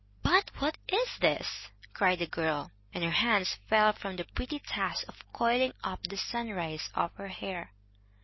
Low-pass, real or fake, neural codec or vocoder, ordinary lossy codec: 7.2 kHz; real; none; MP3, 24 kbps